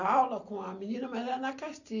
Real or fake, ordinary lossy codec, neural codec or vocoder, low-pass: real; none; none; 7.2 kHz